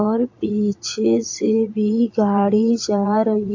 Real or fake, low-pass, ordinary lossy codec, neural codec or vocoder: fake; 7.2 kHz; AAC, 48 kbps; vocoder, 22.05 kHz, 80 mel bands, Vocos